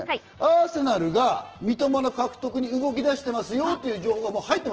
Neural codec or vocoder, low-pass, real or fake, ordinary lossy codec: none; 7.2 kHz; real; Opus, 16 kbps